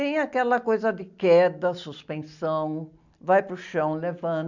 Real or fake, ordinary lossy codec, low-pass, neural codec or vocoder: real; none; 7.2 kHz; none